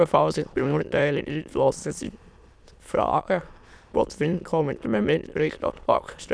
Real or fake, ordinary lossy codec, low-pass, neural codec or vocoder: fake; none; none; autoencoder, 22.05 kHz, a latent of 192 numbers a frame, VITS, trained on many speakers